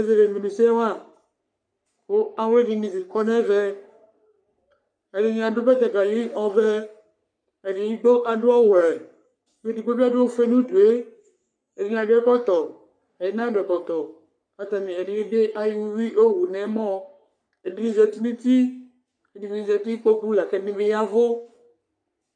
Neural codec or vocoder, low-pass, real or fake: codec, 44.1 kHz, 3.4 kbps, Pupu-Codec; 9.9 kHz; fake